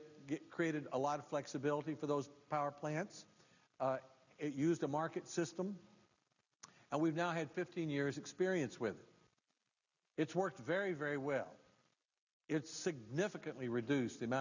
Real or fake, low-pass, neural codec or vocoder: real; 7.2 kHz; none